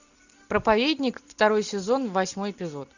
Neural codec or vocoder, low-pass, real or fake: none; 7.2 kHz; real